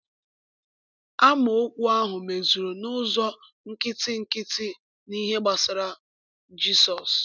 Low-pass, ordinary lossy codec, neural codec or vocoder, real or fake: 7.2 kHz; none; none; real